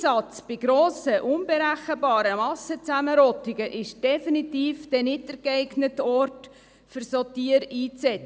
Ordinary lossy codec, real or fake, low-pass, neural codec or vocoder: none; real; none; none